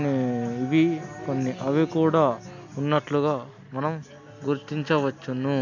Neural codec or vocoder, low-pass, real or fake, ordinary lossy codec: none; 7.2 kHz; real; MP3, 64 kbps